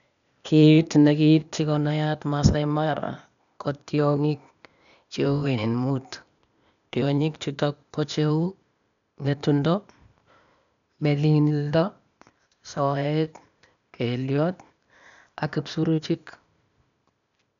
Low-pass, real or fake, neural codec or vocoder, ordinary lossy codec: 7.2 kHz; fake; codec, 16 kHz, 0.8 kbps, ZipCodec; none